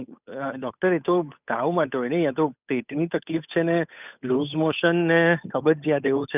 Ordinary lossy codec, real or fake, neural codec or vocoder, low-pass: none; fake; codec, 16 kHz, 8 kbps, FunCodec, trained on Chinese and English, 25 frames a second; 3.6 kHz